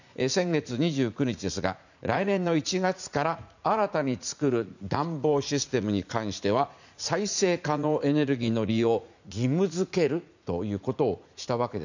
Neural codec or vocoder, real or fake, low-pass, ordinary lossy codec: vocoder, 44.1 kHz, 80 mel bands, Vocos; fake; 7.2 kHz; none